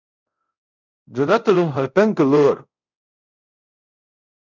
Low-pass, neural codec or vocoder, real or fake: 7.2 kHz; codec, 24 kHz, 0.5 kbps, DualCodec; fake